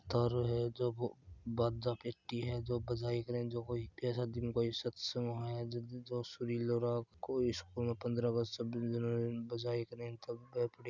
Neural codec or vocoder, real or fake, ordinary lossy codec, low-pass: none; real; none; 7.2 kHz